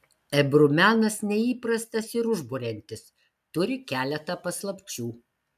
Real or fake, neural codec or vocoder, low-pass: real; none; 14.4 kHz